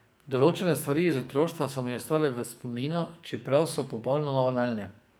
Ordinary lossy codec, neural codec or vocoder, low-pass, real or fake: none; codec, 44.1 kHz, 2.6 kbps, SNAC; none; fake